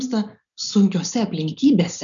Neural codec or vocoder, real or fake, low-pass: none; real; 7.2 kHz